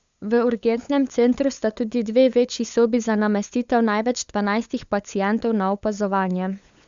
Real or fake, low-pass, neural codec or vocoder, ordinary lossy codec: fake; 7.2 kHz; codec, 16 kHz, 8 kbps, FunCodec, trained on LibriTTS, 25 frames a second; Opus, 64 kbps